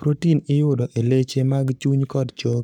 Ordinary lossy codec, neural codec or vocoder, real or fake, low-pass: none; codec, 44.1 kHz, 7.8 kbps, Pupu-Codec; fake; 19.8 kHz